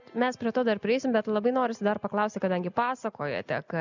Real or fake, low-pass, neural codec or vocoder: real; 7.2 kHz; none